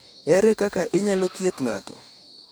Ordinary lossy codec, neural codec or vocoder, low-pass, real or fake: none; codec, 44.1 kHz, 2.6 kbps, DAC; none; fake